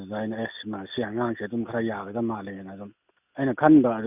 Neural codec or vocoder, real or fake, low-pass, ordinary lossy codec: none; real; 3.6 kHz; none